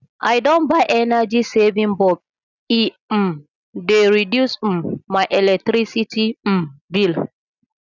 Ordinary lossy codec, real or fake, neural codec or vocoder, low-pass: none; real; none; 7.2 kHz